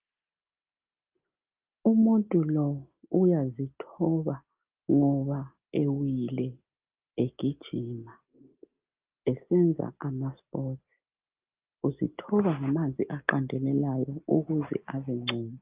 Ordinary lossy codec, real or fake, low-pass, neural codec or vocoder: Opus, 24 kbps; real; 3.6 kHz; none